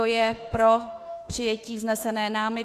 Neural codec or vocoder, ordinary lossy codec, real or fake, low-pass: autoencoder, 48 kHz, 32 numbers a frame, DAC-VAE, trained on Japanese speech; AAC, 64 kbps; fake; 14.4 kHz